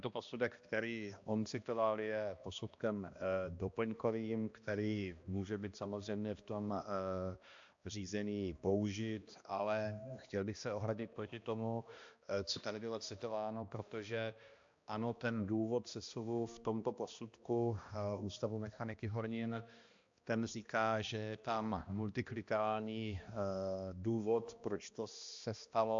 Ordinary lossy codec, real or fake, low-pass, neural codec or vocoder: AAC, 64 kbps; fake; 7.2 kHz; codec, 16 kHz, 1 kbps, X-Codec, HuBERT features, trained on balanced general audio